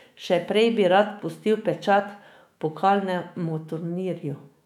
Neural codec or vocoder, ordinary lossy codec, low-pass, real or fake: autoencoder, 48 kHz, 128 numbers a frame, DAC-VAE, trained on Japanese speech; none; 19.8 kHz; fake